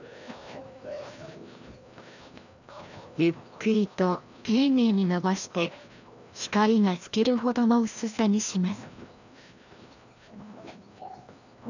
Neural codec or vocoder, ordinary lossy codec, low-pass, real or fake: codec, 16 kHz, 1 kbps, FreqCodec, larger model; none; 7.2 kHz; fake